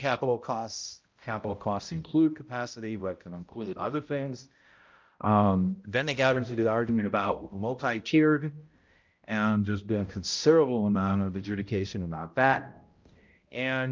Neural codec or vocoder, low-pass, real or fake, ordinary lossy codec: codec, 16 kHz, 0.5 kbps, X-Codec, HuBERT features, trained on balanced general audio; 7.2 kHz; fake; Opus, 24 kbps